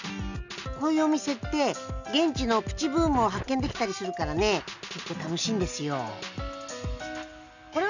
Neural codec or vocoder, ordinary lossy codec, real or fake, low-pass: none; none; real; 7.2 kHz